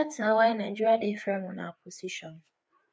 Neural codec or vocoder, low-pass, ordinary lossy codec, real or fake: codec, 16 kHz, 4 kbps, FreqCodec, larger model; none; none; fake